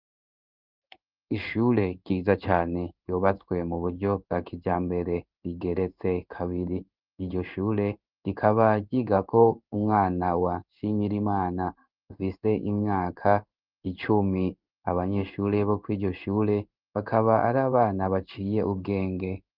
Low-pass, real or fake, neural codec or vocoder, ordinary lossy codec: 5.4 kHz; fake; codec, 16 kHz in and 24 kHz out, 1 kbps, XY-Tokenizer; Opus, 32 kbps